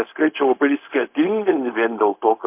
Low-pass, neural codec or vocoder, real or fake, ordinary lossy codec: 3.6 kHz; codec, 16 kHz, 0.4 kbps, LongCat-Audio-Codec; fake; MP3, 32 kbps